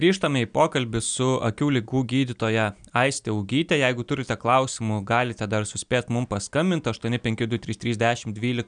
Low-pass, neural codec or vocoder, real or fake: 9.9 kHz; none; real